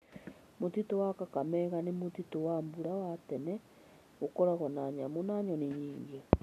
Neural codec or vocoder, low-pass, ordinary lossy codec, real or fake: none; 14.4 kHz; none; real